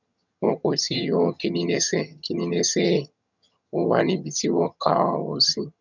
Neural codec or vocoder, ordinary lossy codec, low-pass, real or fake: vocoder, 22.05 kHz, 80 mel bands, HiFi-GAN; none; 7.2 kHz; fake